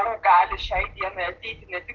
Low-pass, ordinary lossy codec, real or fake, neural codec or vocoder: 7.2 kHz; Opus, 16 kbps; real; none